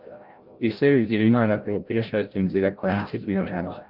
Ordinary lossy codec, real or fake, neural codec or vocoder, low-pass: Opus, 32 kbps; fake; codec, 16 kHz, 0.5 kbps, FreqCodec, larger model; 5.4 kHz